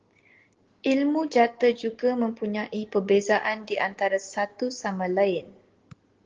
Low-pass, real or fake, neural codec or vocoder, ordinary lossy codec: 7.2 kHz; real; none; Opus, 16 kbps